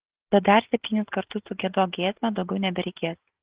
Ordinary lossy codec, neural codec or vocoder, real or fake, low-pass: Opus, 16 kbps; none; real; 3.6 kHz